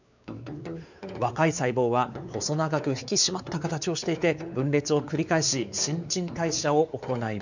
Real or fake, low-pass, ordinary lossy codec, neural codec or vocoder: fake; 7.2 kHz; none; codec, 16 kHz, 4 kbps, X-Codec, WavLM features, trained on Multilingual LibriSpeech